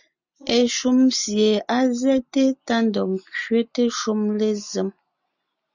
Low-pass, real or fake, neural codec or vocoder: 7.2 kHz; real; none